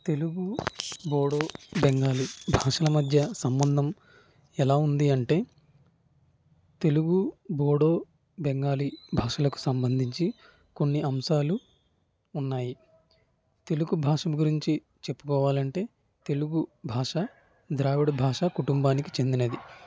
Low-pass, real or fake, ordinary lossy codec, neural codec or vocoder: none; real; none; none